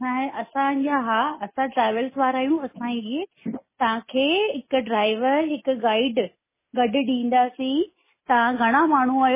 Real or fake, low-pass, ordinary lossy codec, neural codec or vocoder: real; 3.6 kHz; MP3, 16 kbps; none